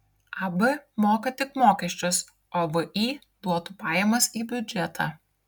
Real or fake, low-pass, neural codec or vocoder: real; 19.8 kHz; none